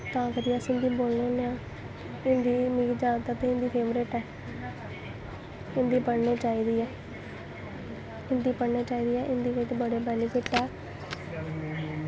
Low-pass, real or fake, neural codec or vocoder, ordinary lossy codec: none; real; none; none